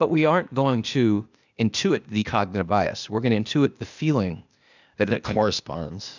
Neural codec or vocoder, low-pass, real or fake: codec, 16 kHz, 0.8 kbps, ZipCodec; 7.2 kHz; fake